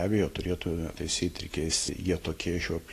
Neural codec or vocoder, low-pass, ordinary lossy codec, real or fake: none; 14.4 kHz; AAC, 48 kbps; real